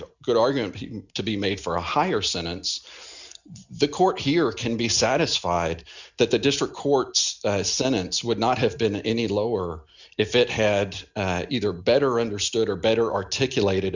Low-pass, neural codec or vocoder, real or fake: 7.2 kHz; none; real